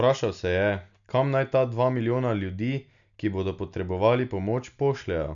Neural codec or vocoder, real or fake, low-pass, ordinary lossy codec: none; real; 7.2 kHz; none